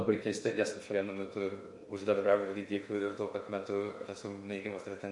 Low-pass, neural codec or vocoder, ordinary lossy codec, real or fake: 10.8 kHz; codec, 16 kHz in and 24 kHz out, 0.8 kbps, FocalCodec, streaming, 65536 codes; MP3, 48 kbps; fake